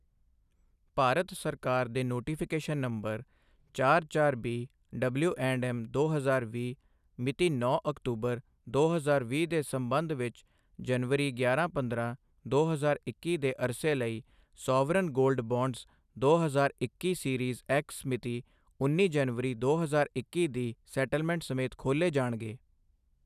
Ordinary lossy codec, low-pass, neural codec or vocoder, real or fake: none; 14.4 kHz; none; real